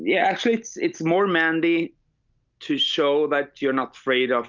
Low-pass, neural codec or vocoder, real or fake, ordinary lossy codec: 7.2 kHz; none; real; Opus, 24 kbps